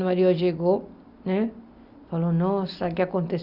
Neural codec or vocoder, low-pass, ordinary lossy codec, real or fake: none; 5.4 kHz; Opus, 64 kbps; real